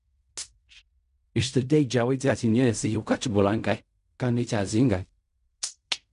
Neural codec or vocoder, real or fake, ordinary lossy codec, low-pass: codec, 16 kHz in and 24 kHz out, 0.4 kbps, LongCat-Audio-Codec, fine tuned four codebook decoder; fake; none; 10.8 kHz